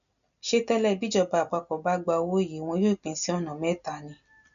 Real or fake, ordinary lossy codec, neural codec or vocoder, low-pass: real; none; none; 7.2 kHz